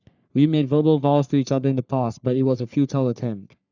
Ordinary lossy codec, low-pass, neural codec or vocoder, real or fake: none; 7.2 kHz; codec, 44.1 kHz, 3.4 kbps, Pupu-Codec; fake